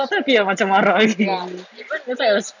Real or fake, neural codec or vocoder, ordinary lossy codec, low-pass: real; none; none; 7.2 kHz